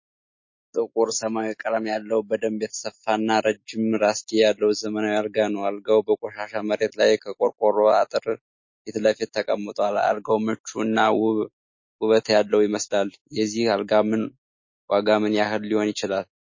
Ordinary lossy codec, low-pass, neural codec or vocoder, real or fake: MP3, 32 kbps; 7.2 kHz; none; real